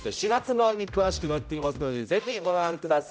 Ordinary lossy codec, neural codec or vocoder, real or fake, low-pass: none; codec, 16 kHz, 0.5 kbps, X-Codec, HuBERT features, trained on general audio; fake; none